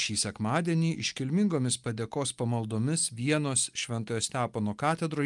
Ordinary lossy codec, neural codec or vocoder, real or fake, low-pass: Opus, 32 kbps; none; real; 10.8 kHz